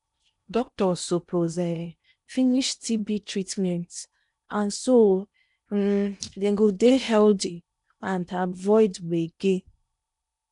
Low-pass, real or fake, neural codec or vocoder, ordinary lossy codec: 10.8 kHz; fake; codec, 16 kHz in and 24 kHz out, 0.8 kbps, FocalCodec, streaming, 65536 codes; none